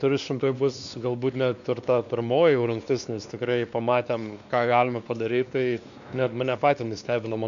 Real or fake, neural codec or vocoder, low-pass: fake; codec, 16 kHz, 2 kbps, X-Codec, WavLM features, trained on Multilingual LibriSpeech; 7.2 kHz